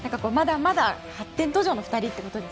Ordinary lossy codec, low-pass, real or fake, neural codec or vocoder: none; none; real; none